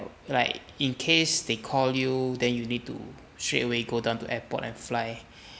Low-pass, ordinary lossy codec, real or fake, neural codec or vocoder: none; none; real; none